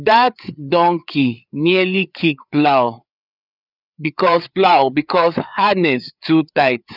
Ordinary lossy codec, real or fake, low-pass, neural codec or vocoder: none; fake; 5.4 kHz; codec, 16 kHz, 4 kbps, FreqCodec, larger model